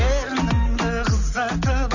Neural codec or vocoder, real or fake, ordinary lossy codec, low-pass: none; real; none; 7.2 kHz